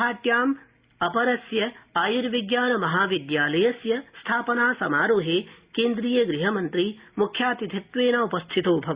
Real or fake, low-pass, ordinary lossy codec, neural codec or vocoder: real; 3.6 kHz; Opus, 64 kbps; none